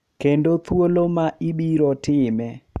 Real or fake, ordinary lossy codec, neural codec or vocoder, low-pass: real; none; none; 14.4 kHz